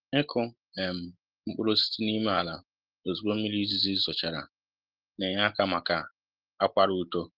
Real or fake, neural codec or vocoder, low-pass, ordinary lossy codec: real; none; 5.4 kHz; Opus, 16 kbps